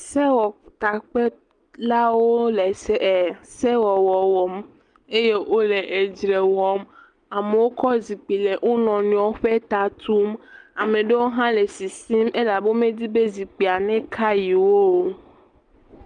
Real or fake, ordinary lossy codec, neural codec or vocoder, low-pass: real; Opus, 32 kbps; none; 9.9 kHz